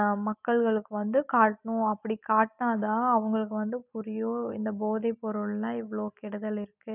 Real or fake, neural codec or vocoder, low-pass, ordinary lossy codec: real; none; 3.6 kHz; none